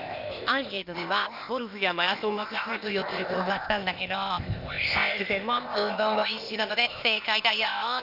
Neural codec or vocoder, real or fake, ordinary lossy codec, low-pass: codec, 16 kHz, 0.8 kbps, ZipCodec; fake; none; 5.4 kHz